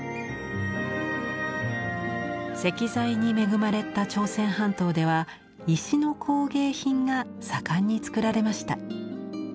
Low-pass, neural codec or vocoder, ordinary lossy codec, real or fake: none; none; none; real